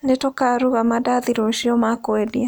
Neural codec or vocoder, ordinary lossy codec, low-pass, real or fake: none; none; none; real